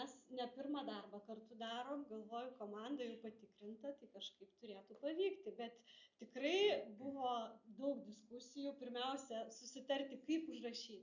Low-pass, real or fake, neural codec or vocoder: 7.2 kHz; real; none